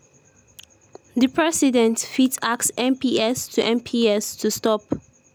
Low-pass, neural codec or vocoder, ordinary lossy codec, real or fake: none; none; none; real